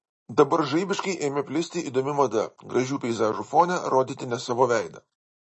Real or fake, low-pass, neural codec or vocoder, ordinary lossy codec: real; 10.8 kHz; none; MP3, 32 kbps